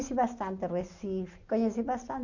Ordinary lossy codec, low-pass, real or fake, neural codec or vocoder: none; 7.2 kHz; real; none